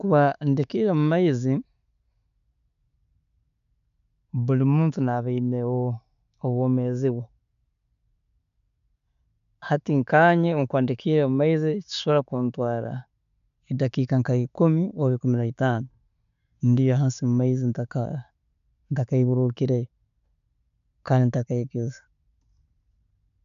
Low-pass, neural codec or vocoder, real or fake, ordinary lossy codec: 7.2 kHz; none; real; none